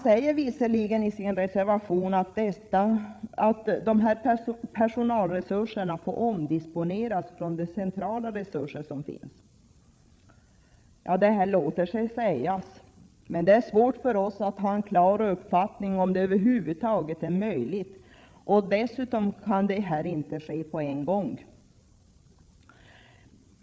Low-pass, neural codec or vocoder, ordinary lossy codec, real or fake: none; codec, 16 kHz, 8 kbps, FreqCodec, larger model; none; fake